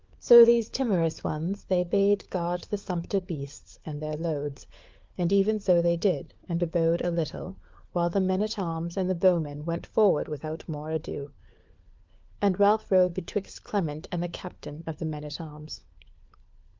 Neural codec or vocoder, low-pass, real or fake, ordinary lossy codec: codec, 16 kHz, 4 kbps, FunCodec, trained on LibriTTS, 50 frames a second; 7.2 kHz; fake; Opus, 32 kbps